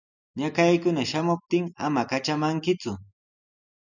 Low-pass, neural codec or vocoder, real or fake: 7.2 kHz; none; real